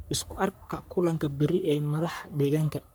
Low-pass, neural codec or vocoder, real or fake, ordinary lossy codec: none; codec, 44.1 kHz, 3.4 kbps, Pupu-Codec; fake; none